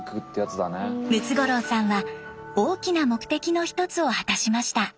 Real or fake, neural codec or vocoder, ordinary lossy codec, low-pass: real; none; none; none